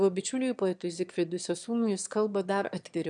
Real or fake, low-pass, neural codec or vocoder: fake; 9.9 kHz; autoencoder, 22.05 kHz, a latent of 192 numbers a frame, VITS, trained on one speaker